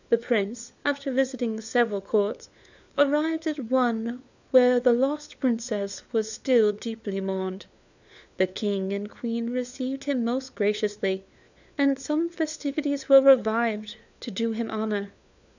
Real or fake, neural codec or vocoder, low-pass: fake; codec, 16 kHz, 4 kbps, FunCodec, trained on LibriTTS, 50 frames a second; 7.2 kHz